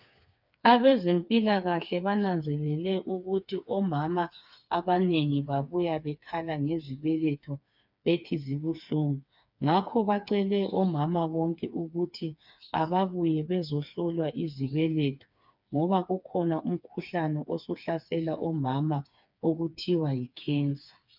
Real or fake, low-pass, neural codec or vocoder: fake; 5.4 kHz; codec, 16 kHz, 4 kbps, FreqCodec, smaller model